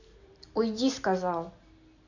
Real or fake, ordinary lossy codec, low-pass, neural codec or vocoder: real; none; 7.2 kHz; none